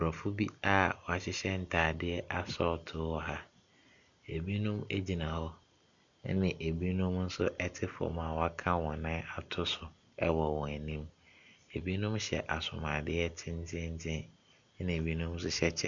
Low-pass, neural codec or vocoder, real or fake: 7.2 kHz; none; real